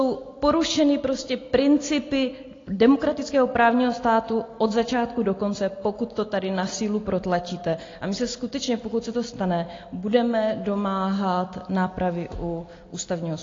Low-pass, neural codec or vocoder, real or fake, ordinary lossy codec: 7.2 kHz; none; real; AAC, 32 kbps